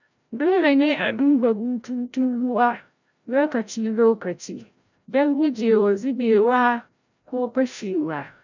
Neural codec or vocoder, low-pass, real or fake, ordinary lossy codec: codec, 16 kHz, 0.5 kbps, FreqCodec, larger model; 7.2 kHz; fake; none